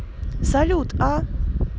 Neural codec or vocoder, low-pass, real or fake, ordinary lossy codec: none; none; real; none